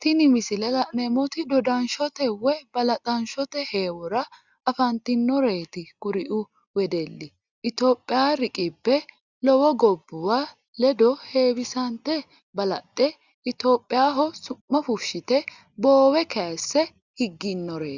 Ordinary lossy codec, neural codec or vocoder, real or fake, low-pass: Opus, 64 kbps; none; real; 7.2 kHz